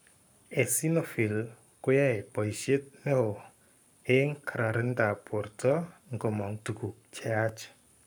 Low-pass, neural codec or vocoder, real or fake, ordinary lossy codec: none; codec, 44.1 kHz, 7.8 kbps, Pupu-Codec; fake; none